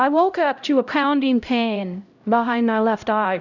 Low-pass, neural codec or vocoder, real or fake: 7.2 kHz; codec, 16 kHz, 0.5 kbps, X-Codec, HuBERT features, trained on LibriSpeech; fake